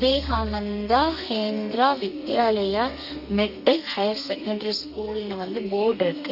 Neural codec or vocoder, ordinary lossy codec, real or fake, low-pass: codec, 32 kHz, 1.9 kbps, SNAC; none; fake; 5.4 kHz